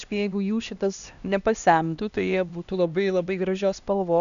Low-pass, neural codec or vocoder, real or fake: 7.2 kHz; codec, 16 kHz, 1 kbps, X-Codec, HuBERT features, trained on LibriSpeech; fake